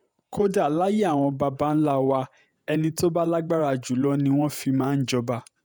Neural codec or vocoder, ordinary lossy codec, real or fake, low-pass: vocoder, 48 kHz, 128 mel bands, Vocos; none; fake; none